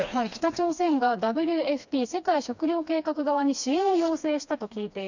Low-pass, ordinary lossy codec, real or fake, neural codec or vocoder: 7.2 kHz; none; fake; codec, 16 kHz, 2 kbps, FreqCodec, smaller model